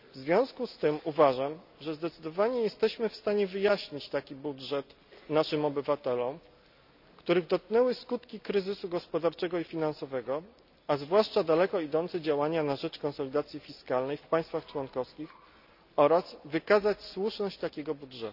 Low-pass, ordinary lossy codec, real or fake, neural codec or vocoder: 5.4 kHz; none; real; none